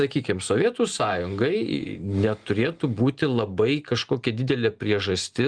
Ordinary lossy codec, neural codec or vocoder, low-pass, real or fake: Opus, 24 kbps; none; 9.9 kHz; real